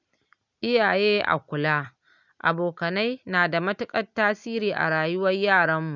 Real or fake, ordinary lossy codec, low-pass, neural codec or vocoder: real; none; 7.2 kHz; none